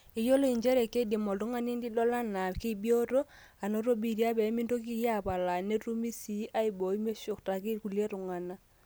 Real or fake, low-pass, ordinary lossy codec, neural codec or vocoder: real; none; none; none